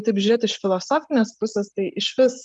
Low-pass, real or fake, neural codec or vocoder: 10.8 kHz; real; none